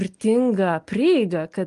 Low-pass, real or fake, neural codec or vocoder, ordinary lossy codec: 10.8 kHz; real; none; Opus, 32 kbps